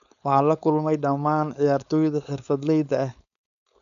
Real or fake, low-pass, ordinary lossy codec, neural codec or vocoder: fake; 7.2 kHz; none; codec, 16 kHz, 4.8 kbps, FACodec